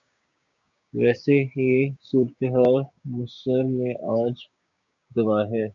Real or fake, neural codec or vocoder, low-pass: fake; codec, 16 kHz, 6 kbps, DAC; 7.2 kHz